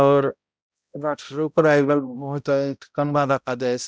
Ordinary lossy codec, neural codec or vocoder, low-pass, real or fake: none; codec, 16 kHz, 0.5 kbps, X-Codec, HuBERT features, trained on balanced general audio; none; fake